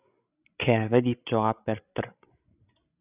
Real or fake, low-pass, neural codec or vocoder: fake; 3.6 kHz; codec, 16 kHz, 16 kbps, FreqCodec, larger model